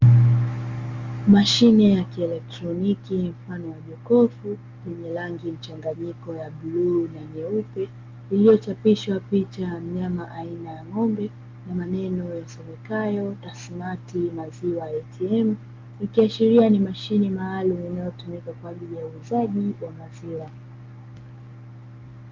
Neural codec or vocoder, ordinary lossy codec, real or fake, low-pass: none; Opus, 32 kbps; real; 7.2 kHz